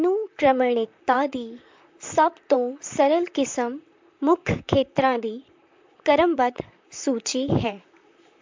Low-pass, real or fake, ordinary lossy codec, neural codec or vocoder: 7.2 kHz; fake; MP3, 64 kbps; vocoder, 44.1 kHz, 128 mel bands, Pupu-Vocoder